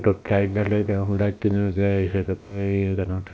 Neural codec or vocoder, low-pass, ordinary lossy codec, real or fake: codec, 16 kHz, about 1 kbps, DyCAST, with the encoder's durations; none; none; fake